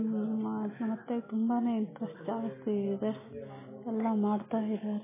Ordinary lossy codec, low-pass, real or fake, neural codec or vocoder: MP3, 16 kbps; 3.6 kHz; real; none